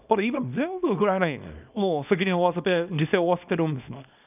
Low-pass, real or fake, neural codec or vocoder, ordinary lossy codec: 3.6 kHz; fake; codec, 24 kHz, 0.9 kbps, WavTokenizer, small release; none